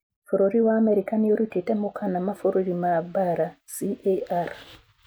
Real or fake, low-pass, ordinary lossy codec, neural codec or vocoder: real; none; none; none